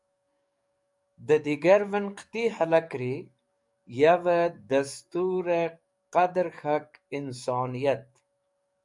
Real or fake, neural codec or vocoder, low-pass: fake; codec, 44.1 kHz, 7.8 kbps, DAC; 10.8 kHz